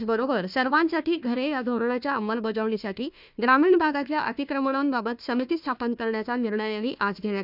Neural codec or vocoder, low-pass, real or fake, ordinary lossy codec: codec, 16 kHz, 1 kbps, FunCodec, trained on Chinese and English, 50 frames a second; 5.4 kHz; fake; none